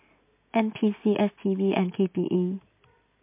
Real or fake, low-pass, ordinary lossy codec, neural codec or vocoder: real; 3.6 kHz; MP3, 24 kbps; none